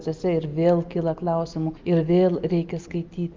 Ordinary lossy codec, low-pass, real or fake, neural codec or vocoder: Opus, 24 kbps; 7.2 kHz; real; none